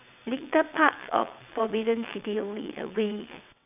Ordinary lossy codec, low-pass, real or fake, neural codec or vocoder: none; 3.6 kHz; fake; vocoder, 22.05 kHz, 80 mel bands, WaveNeXt